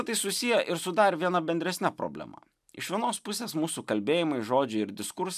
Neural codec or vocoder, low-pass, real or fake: none; 14.4 kHz; real